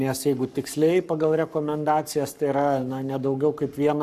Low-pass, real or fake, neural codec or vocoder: 14.4 kHz; fake; codec, 44.1 kHz, 7.8 kbps, Pupu-Codec